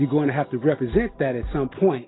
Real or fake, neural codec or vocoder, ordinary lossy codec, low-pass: real; none; AAC, 16 kbps; 7.2 kHz